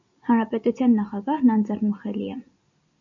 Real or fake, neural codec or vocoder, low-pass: real; none; 7.2 kHz